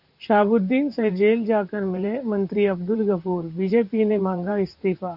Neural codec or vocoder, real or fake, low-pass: vocoder, 44.1 kHz, 128 mel bands, Pupu-Vocoder; fake; 5.4 kHz